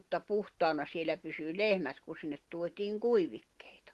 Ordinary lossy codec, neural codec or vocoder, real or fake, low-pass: Opus, 16 kbps; none; real; 14.4 kHz